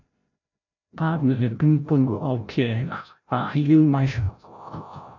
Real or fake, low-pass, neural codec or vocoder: fake; 7.2 kHz; codec, 16 kHz, 0.5 kbps, FreqCodec, larger model